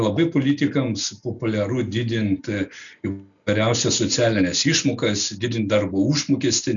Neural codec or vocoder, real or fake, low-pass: none; real; 7.2 kHz